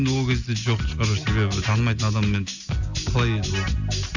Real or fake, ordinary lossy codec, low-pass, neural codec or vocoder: real; none; 7.2 kHz; none